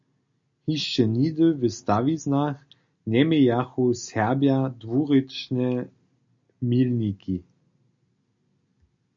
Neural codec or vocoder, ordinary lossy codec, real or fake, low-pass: none; MP3, 48 kbps; real; 7.2 kHz